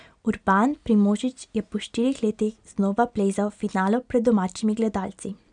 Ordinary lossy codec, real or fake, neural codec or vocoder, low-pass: none; real; none; 9.9 kHz